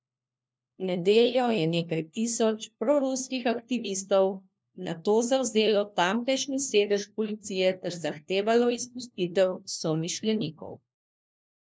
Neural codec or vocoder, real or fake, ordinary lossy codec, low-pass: codec, 16 kHz, 1 kbps, FunCodec, trained on LibriTTS, 50 frames a second; fake; none; none